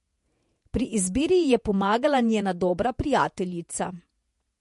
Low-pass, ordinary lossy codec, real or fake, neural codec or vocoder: 14.4 kHz; MP3, 48 kbps; fake; vocoder, 48 kHz, 128 mel bands, Vocos